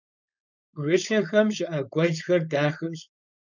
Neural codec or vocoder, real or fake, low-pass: codec, 16 kHz, 4.8 kbps, FACodec; fake; 7.2 kHz